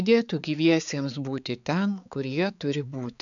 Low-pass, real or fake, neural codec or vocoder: 7.2 kHz; fake; codec, 16 kHz, 4 kbps, X-Codec, HuBERT features, trained on balanced general audio